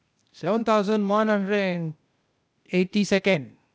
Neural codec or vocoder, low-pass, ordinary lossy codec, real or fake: codec, 16 kHz, 0.8 kbps, ZipCodec; none; none; fake